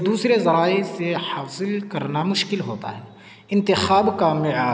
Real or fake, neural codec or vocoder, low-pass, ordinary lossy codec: real; none; none; none